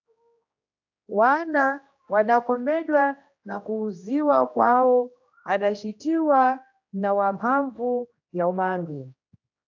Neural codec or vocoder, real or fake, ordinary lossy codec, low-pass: codec, 16 kHz, 1 kbps, X-Codec, HuBERT features, trained on general audio; fake; AAC, 48 kbps; 7.2 kHz